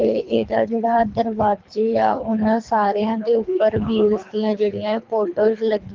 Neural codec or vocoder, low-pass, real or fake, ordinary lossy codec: codec, 24 kHz, 3 kbps, HILCodec; 7.2 kHz; fake; Opus, 32 kbps